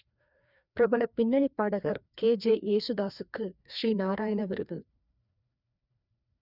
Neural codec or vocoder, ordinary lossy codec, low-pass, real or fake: codec, 16 kHz, 2 kbps, FreqCodec, larger model; none; 5.4 kHz; fake